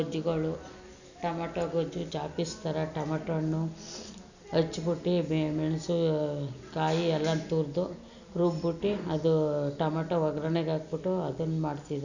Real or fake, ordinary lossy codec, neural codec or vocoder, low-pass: real; none; none; 7.2 kHz